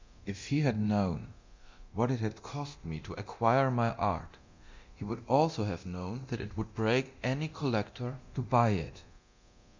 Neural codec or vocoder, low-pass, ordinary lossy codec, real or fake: codec, 24 kHz, 0.9 kbps, DualCodec; 7.2 kHz; MP3, 64 kbps; fake